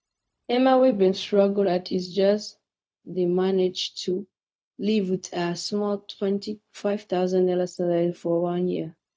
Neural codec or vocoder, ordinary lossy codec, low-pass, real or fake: codec, 16 kHz, 0.4 kbps, LongCat-Audio-Codec; none; none; fake